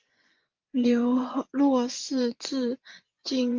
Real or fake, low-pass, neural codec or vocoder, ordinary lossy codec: fake; 7.2 kHz; vocoder, 24 kHz, 100 mel bands, Vocos; Opus, 24 kbps